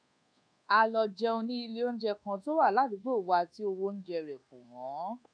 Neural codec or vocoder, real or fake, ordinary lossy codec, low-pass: codec, 24 kHz, 1.2 kbps, DualCodec; fake; none; 9.9 kHz